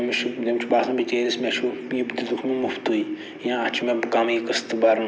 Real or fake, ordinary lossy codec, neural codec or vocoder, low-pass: real; none; none; none